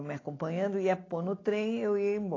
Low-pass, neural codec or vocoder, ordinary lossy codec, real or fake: 7.2 kHz; none; AAC, 32 kbps; real